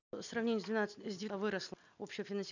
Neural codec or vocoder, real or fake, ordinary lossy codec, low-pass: none; real; none; 7.2 kHz